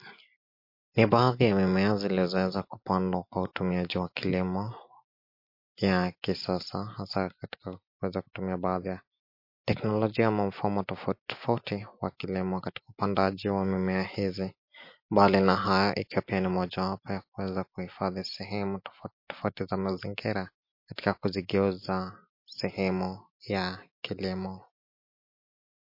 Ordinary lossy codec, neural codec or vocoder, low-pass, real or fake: MP3, 32 kbps; none; 5.4 kHz; real